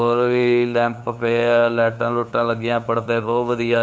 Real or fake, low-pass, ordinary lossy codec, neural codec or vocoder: fake; none; none; codec, 16 kHz, 2 kbps, FunCodec, trained on LibriTTS, 25 frames a second